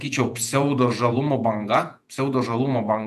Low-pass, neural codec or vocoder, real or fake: 14.4 kHz; vocoder, 48 kHz, 128 mel bands, Vocos; fake